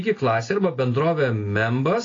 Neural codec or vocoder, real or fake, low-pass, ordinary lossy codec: none; real; 7.2 kHz; AAC, 32 kbps